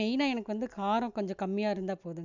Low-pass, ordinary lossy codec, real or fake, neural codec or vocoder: 7.2 kHz; none; real; none